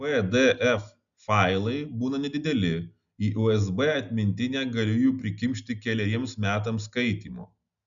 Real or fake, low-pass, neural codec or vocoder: real; 7.2 kHz; none